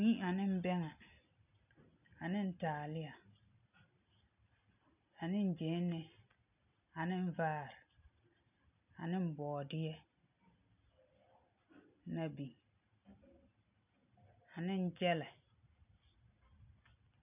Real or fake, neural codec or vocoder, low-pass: real; none; 3.6 kHz